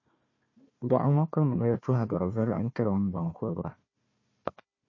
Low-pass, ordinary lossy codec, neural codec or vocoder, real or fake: 7.2 kHz; MP3, 32 kbps; codec, 16 kHz, 1 kbps, FunCodec, trained on Chinese and English, 50 frames a second; fake